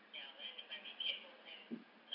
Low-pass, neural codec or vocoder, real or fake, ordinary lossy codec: 5.4 kHz; vocoder, 44.1 kHz, 128 mel bands every 256 samples, BigVGAN v2; fake; none